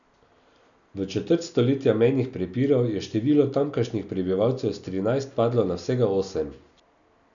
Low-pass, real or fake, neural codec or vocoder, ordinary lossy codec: 7.2 kHz; real; none; none